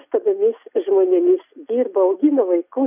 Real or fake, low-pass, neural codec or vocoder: real; 3.6 kHz; none